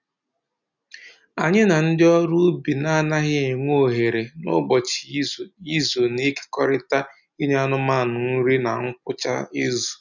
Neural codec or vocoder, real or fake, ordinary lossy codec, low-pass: none; real; none; 7.2 kHz